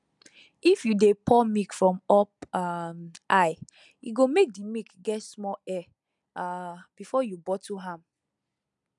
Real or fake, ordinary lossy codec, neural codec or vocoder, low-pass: real; none; none; 10.8 kHz